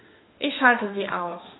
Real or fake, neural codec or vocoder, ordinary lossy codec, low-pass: fake; autoencoder, 48 kHz, 32 numbers a frame, DAC-VAE, trained on Japanese speech; AAC, 16 kbps; 7.2 kHz